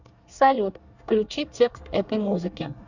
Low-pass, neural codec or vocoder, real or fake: 7.2 kHz; codec, 24 kHz, 1 kbps, SNAC; fake